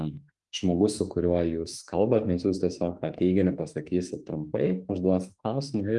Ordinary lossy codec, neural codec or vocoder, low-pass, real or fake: Opus, 32 kbps; autoencoder, 48 kHz, 32 numbers a frame, DAC-VAE, trained on Japanese speech; 10.8 kHz; fake